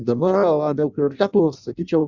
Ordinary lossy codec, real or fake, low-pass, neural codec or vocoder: Opus, 64 kbps; fake; 7.2 kHz; codec, 16 kHz in and 24 kHz out, 0.6 kbps, FireRedTTS-2 codec